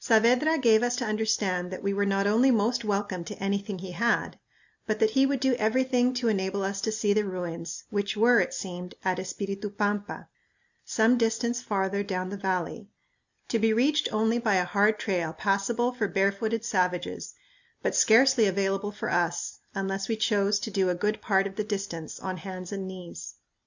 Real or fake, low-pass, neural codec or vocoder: real; 7.2 kHz; none